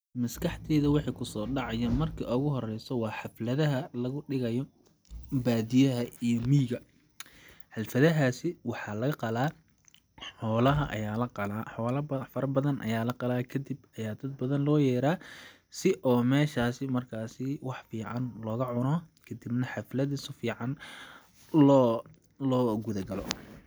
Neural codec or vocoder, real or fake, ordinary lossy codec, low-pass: none; real; none; none